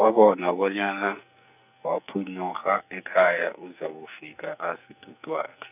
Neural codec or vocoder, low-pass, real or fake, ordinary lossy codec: codec, 44.1 kHz, 2.6 kbps, SNAC; 3.6 kHz; fake; none